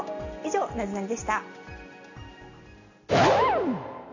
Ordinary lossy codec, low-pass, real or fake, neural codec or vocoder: none; 7.2 kHz; real; none